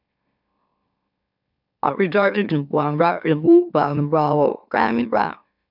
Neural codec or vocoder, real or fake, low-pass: autoencoder, 44.1 kHz, a latent of 192 numbers a frame, MeloTTS; fake; 5.4 kHz